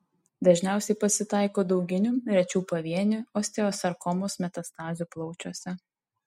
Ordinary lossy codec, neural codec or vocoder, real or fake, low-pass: MP3, 64 kbps; none; real; 19.8 kHz